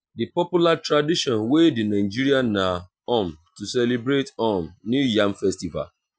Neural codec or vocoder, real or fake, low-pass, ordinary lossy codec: none; real; none; none